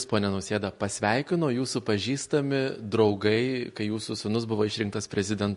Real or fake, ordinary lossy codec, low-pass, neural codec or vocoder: real; MP3, 48 kbps; 14.4 kHz; none